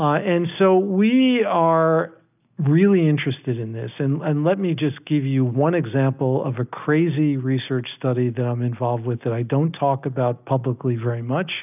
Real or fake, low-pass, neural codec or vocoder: real; 3.6 kHz; none